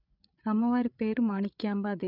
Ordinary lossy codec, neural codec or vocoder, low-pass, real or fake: none; codec, 16 kHz, 8 kbps, FreqCodec, larger model; 5.4 kHz; fake